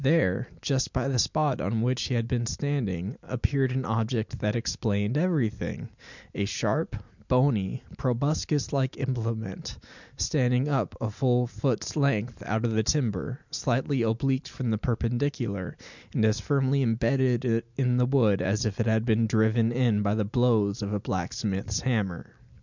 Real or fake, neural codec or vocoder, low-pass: real; none; 7.2 kHz